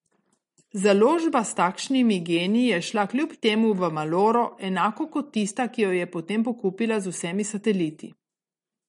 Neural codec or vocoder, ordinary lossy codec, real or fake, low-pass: none; MP3, 48 kbps; real; 19.8 kHz